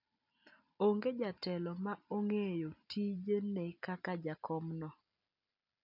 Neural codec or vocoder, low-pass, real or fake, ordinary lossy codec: none; 5.4 kHz; real; none